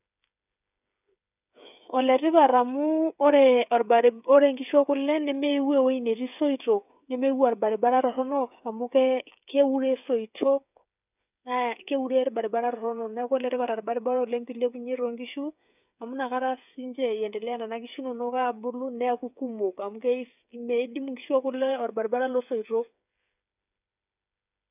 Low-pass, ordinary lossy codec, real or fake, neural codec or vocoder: 3.6 kHz; none; fake; codec, 16 kHz, 8 kbps, FreqCodec, smaller model